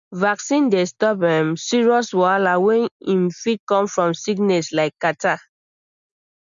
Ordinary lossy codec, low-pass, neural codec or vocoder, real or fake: none; 7.2 kHz; none; real